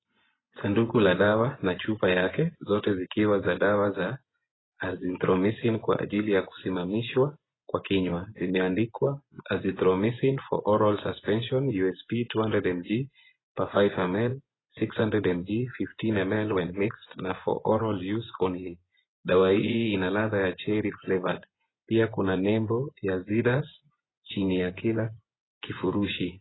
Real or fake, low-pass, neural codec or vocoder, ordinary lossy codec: fake; 7.2 kHz; vocoder, 24 kHz, 100 mel bands, Vocos; AAC, 16 kbps